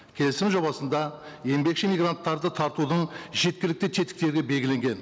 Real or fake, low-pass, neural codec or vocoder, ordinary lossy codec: real; none; none; none